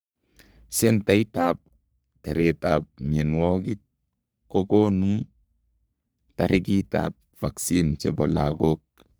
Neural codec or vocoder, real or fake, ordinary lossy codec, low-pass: codec, 44.1 kHz, 3.4 kbps, Pupu-Codec; fake; none; none